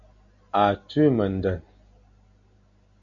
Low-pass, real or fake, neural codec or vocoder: 7.2 kHz; real; none